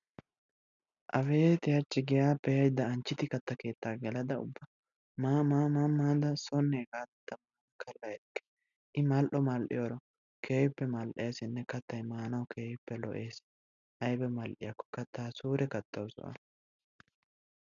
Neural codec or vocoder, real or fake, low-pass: none; real; 7.2 kHz